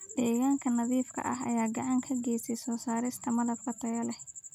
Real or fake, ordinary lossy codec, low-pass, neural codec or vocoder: real; none; 19.8 kHz; none